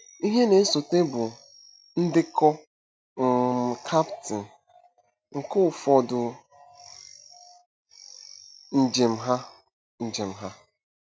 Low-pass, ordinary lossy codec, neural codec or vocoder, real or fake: none; none; none; real